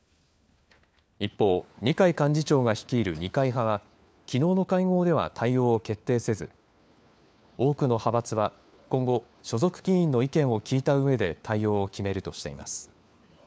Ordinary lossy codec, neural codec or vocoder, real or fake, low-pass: none; codec, 16 kHz, 4 kbps, FunCodec, trained on LibriTTS, 50 frames a second; fake; none